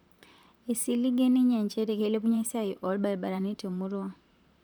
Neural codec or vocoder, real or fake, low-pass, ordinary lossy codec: vocoder, 44.1 kHz, 128 mel bands, Pupu-Vocoder; fake; none; none